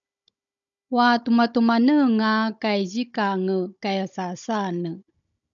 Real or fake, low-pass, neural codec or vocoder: fake; 7.2 kHz; codec, 16 kHz, 16 kbps, FunCodec, trained on Chinese and English, 50 frames a second